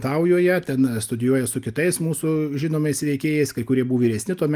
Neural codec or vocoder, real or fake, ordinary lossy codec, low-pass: none; real; Opus, 32 kbps; 14.4 kHz